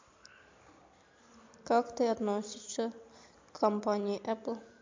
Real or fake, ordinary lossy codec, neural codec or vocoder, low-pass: fake; MP3, 48 kbps; vocoder, 44.1 kHz, 128 mel bands every 256 samples, BigVGAN v2; 7.2 kHz